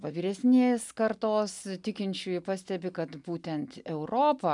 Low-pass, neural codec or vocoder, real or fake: 10.8 kHz; none; real